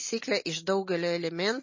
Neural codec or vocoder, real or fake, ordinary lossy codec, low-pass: none; real; MP3, 32 kbps; 7.2 kHz